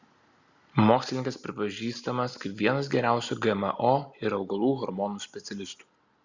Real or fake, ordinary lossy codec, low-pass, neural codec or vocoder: real; Opus, 64 kbps; 7.2 kHz; none